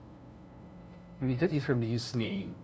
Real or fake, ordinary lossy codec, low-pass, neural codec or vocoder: fake; none; none; codec, 16 kHz, 0.5 kbps, FunCodec, trained on LibriTTS, 25 frames a second